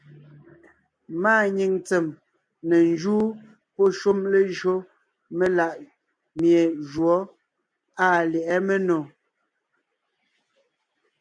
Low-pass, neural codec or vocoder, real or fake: 9.9 kHz; none; real